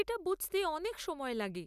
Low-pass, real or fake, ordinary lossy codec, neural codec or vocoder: 14.4 kHz; real; none; none